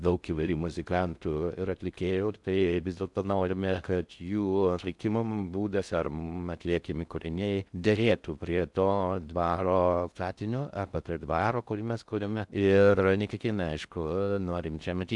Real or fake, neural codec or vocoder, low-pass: fake; codec, 16 kHz in and 24 kHz out, 0.6 kbps, FocalCodec, streaming, 2048 codes; 10.8 kHz